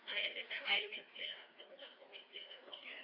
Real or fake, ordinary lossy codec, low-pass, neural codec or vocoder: fake; AAC, 24 kbps; 5.4 kHz; codec, 16 kHz, 2 kbps, FreqCodec, larger model